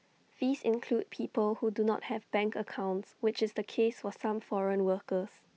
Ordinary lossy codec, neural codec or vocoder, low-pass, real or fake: none; none; none; real